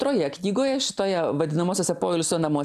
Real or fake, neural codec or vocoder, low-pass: real; none; 14.4 kHz